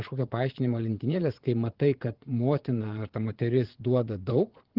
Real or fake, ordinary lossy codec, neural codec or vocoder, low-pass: fake; Opus, 16 kbps; vocoder, 22.05 kHz, 80 mel bands, Vocos; 5.4 kHz